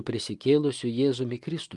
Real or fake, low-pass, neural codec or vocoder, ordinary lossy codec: real; 10.8 kHz; none; Opus, 32 kbps